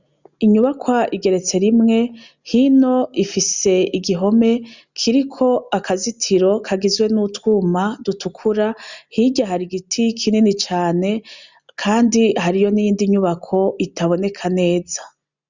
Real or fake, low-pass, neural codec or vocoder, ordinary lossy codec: real; 7.2 kHz; none; Opus, 64 kbps